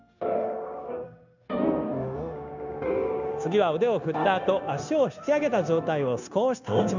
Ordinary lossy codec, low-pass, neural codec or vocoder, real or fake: none; 7.2 kHz; codec, 16 kHz, 0.9 kbps, LongCat-Audio-Codec; fake